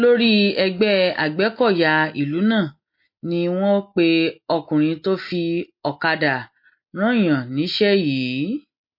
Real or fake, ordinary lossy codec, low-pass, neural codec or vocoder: real; MP3, 32 kbps; 5.4 kHz; none